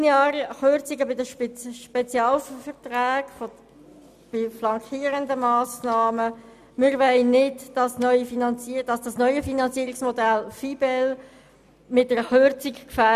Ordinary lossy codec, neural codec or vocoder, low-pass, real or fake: none; none; 14.4 kHz; real